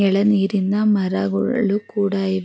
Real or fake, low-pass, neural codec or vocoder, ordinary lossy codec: real; none; none; none